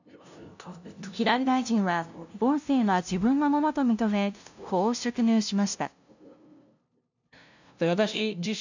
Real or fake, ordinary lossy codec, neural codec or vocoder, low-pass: fake; none; codec, 16 kHz, 0.5 kbps, FunCodec, trained on LibriTTS, 25 frames a second; 7.2 kHz